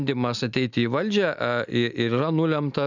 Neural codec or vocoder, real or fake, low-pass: none; real; 7.2 kHz